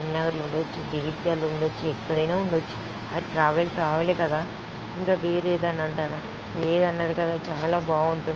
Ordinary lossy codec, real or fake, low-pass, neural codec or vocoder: Opus, 24 kbps; fake; 7.2 kHz; codec, 16 kHz, 2 kbps, FunCodec, trained on Chinese and English, 25 frames a second